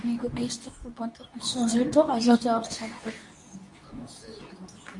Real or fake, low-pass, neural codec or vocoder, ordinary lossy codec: fake; 10.8 kHz; codec, 24 kHz, 0.9 kbps, WavTokenizer, medium speech release version 2; Opus, 64 kbps